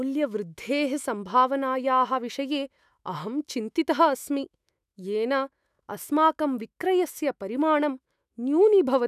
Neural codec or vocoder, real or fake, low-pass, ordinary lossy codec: autoencoder, 48 kHz, 128 numbers a frame, DAC-VAE, trained on Japanese speech; fake; 14.4 kHz; none